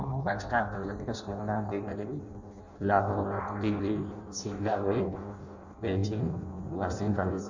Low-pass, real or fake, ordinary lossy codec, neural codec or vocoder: 7.2 kHz; fake; none; codec, 16 kHz in and 24 kHz out, 0.6 kbps, FireRedTTS-2 codec